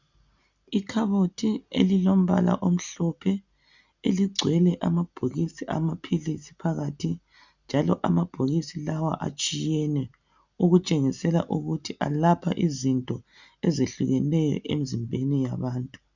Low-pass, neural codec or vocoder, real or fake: 7.2 kHz; none; real